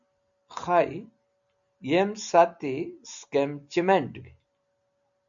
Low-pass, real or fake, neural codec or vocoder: 7.2 kHz; real; none